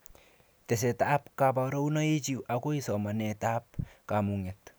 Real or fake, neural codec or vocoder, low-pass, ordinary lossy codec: real; none; none; none